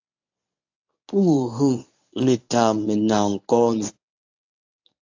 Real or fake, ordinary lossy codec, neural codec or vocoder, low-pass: fake; AAC, 48 kbps; codec, 24 kHz, 0.9 kbps, WavTokenizer, medium speech release version 1; 7.2 kHz